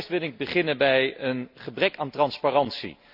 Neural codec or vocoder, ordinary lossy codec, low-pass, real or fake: none; none; 5.4 kHz; real